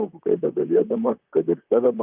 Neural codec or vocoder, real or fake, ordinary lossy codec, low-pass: vocoder, 44.1 kHz, 128 mel bands, Pupu-Vocoder; fake; Opus, 24 kbps; 3.6 kHz